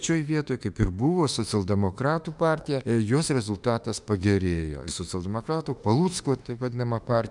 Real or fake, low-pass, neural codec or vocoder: fake; 10.8 kHz; autoencoder, 48 kHz, 32 numbers a frame, DAC-VAE, trained on Japanese speech